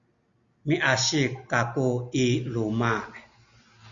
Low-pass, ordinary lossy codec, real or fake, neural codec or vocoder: 7.2 kHz; Opus, 64 kbps; real; none